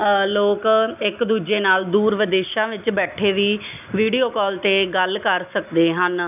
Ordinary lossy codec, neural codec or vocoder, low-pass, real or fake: none; none; 3.6 kHz; real